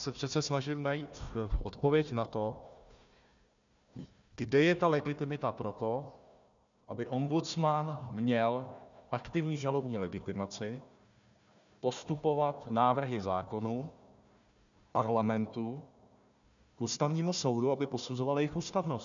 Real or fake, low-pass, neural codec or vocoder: fake; 7.2 kHz; codec, 16 kHz, 1 kbps, FunCodec, trained on Chinese and English, 50 frames a second